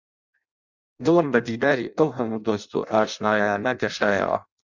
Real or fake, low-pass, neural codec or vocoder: fake; 7.2 kHz; codec, 16 kHz in and 24 kHz out, 0.6 kbps, FireRedTTS-2 codec